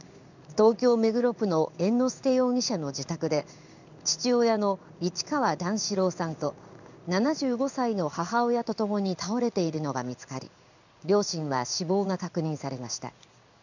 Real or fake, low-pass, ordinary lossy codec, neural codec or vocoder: fake; 7.2 kHz; none; codec, 16 kHz in and 24 kHz out, 1 kbps, XY-Tokenizer